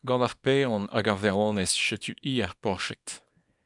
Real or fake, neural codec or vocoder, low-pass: fake; codec, 24 kHz, 0.9 kbps, WavTokenizer, small release; 10.8 kHz